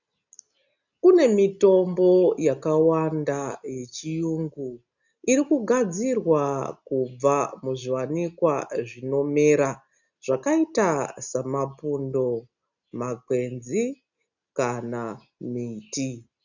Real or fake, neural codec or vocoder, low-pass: real; none; 7.2 kHz